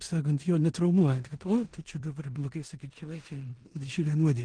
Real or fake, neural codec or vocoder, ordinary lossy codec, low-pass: fake; codec, 16 kHz in and 24 kHz out, 0.9 kbps, LongCat-Audio-Codec, four codebook decoder; Opus, 16 kbps; 9.9 kHz